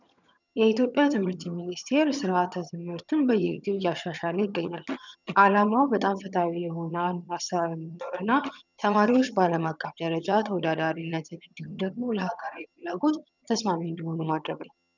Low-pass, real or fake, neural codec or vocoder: 7.2 kHz; fake; vocoder, 22.05 kHz, 80 mel bands, HiFi-GAN